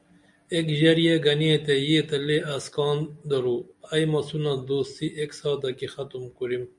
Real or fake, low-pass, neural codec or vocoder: real; 10.8 kHz; none